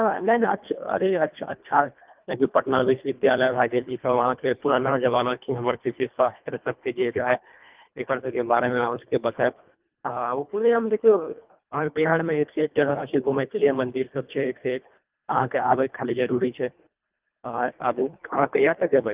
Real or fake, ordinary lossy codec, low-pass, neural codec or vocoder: fake; Opus, 24 kbps; 3.6 kHz; codec, 24 kHz, 1.5 kbps, HILCodec